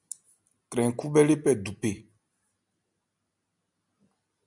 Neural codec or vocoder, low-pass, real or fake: none; 10.8 kHz; real